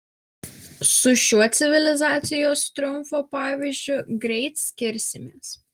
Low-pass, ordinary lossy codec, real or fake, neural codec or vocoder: 19.8 kHz; Opus, 24 kbps; real; none